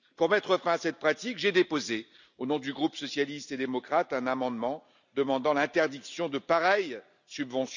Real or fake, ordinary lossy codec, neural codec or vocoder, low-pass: real; none; none; 7.2 kHz